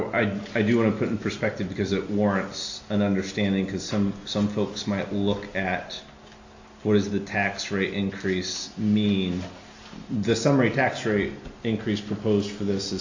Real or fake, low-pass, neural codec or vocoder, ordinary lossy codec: real; 7.2 kHz; none; AAC, 48 kbps